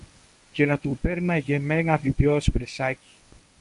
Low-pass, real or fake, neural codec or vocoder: 10.8 kHz; fake; codec, 24 kHz, 0.9 kbps, WavTokenizer, medium speech release version 1